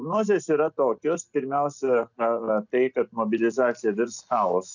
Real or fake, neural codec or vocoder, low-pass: real; none; 7.2 kHz